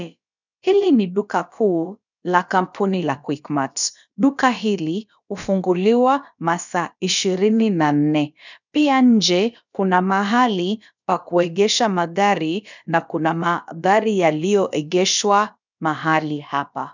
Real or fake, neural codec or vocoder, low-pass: fake; codec, 16 kHz, about 1 kbps, DyCAST, with the encoder's durations; 7.2 kHz